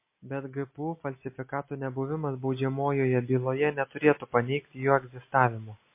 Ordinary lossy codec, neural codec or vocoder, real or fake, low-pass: MP3, 24 kbps; none; real; 3.6 kHz